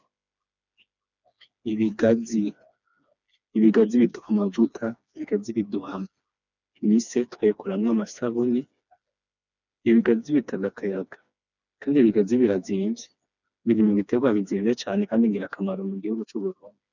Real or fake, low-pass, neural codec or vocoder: fake; 7.2 kHz; codec, 16 kHz, 2 kbps, FreqCodec, smaller model